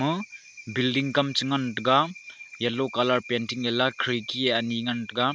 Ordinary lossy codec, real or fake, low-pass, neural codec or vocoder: none; real; none; none